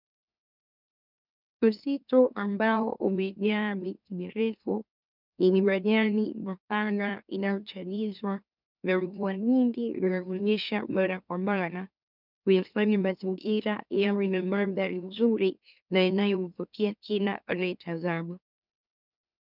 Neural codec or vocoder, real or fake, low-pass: autoencoder, 44.1 kHz, a latent of 192 numbers a frame, MeloTTS; fake; 5.4 kHz